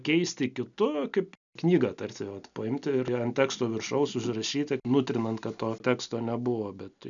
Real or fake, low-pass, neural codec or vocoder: real; 7.2 kHz; none